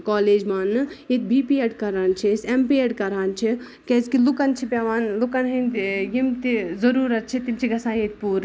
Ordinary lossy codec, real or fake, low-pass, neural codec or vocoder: none; real; none; none